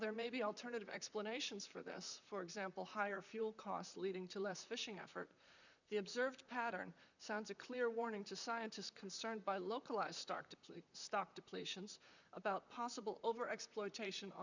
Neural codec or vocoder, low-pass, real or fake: vocoder, 44.1 kHz, 128 mel bands, Pupu-Vocoder; 7.2 kHz; fake